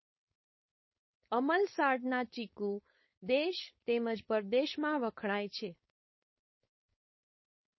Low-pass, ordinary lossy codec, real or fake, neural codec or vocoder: 7.2 kHz; MP3, 24 kbps; fake; codec, 16 kHz, 4.8 kbps, FACodec